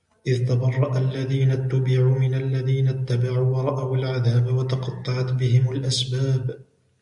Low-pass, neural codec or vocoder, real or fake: 10.8 kHz; none; real